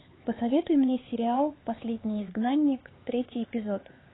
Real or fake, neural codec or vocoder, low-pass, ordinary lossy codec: fake; codec, 16 kHz, 4 kbps, X-Codec, HuBERT features, trained on LibriSpeech; 7.2 kHz; AAC, 16 kbps